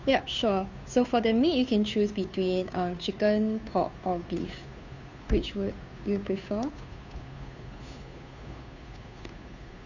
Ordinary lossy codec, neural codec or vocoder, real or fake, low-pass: none; codec, 16 kHz, 2 kbps, FunCodec, trained on Chinese and English, 25 frames a second; fake; 7.2 kHz